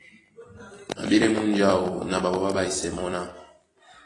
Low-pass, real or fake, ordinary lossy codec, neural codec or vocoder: 10.8 kHz; fake; AAC, 32 kbps; vocoder, 44.1 kHz, 128 mel bands every 256 samples, BigVGAN v2